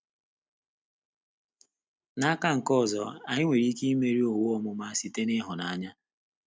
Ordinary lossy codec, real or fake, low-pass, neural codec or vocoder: none; real; none; none